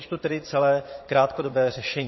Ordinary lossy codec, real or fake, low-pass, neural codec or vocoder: MP3, 24 kbps; fake; 7.2 kHz; vocoder, 22.05 kHz, 80 mel bands, Vocos